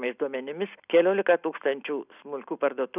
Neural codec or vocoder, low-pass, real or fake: none; 3.6 kHz; real